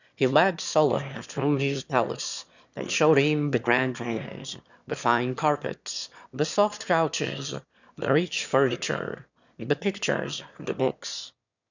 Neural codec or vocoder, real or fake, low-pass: autoencoder, 22.05 kHz, a latent of 192 numbers a frame, VITS, trained on one speaker; fake; 7.2 kHz